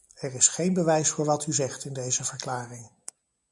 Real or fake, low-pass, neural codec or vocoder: real; 10.8 kHz; none